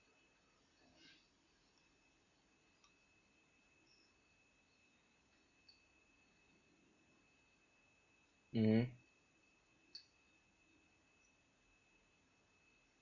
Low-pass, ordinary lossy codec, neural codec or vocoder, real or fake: 7.2 kHz; none; none; real